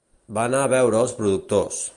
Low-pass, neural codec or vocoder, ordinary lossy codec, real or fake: 10.8 kHz; none; Opus, 32 kbps; real